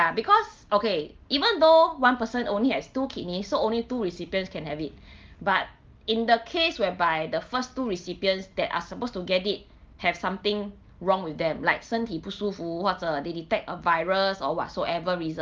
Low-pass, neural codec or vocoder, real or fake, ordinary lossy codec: 7.2 kHz; none; real; Opus, 32 kbps